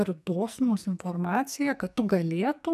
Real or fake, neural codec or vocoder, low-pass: fake; codec, 44.1 kHz, 2.6 kbps, SNAC; 14.4 kHz